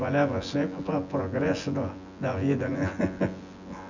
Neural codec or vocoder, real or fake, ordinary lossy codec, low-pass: vocoder, 24 kHz, 100 mel bands, Vocos; fake; none; 7.2 kHz